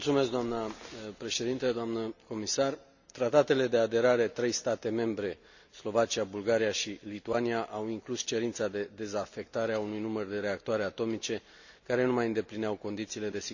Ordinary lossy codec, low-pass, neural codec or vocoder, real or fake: none; 7.2 kHz; none; real